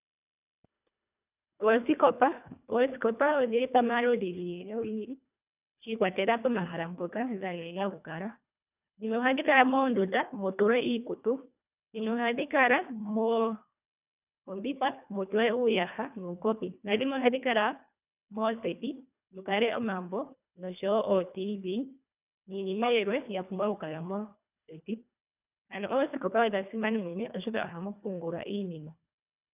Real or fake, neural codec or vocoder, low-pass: fake; codec, 24 kHz, 1.5 kbps, HILCodec; 3.6 kHz